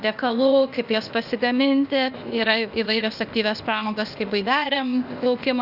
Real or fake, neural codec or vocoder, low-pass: fake; codec, 16 kHz, 0.8 kbps, ZipCodec; 5.4 kHz